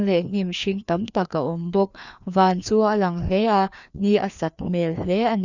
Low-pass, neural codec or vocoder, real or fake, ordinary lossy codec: 7.2 kHz; codec, 16 kHz, 2 kbps, FreqCodec, larger model; fake; none